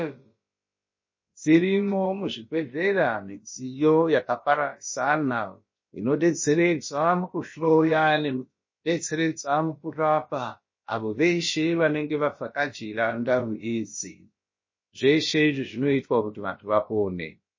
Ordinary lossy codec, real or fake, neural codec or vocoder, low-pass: MP3, 32 kbps; fake; codec, 16 kHz, about 1 kbps, DyCAST, with the encoder's durations; 7.2 kHz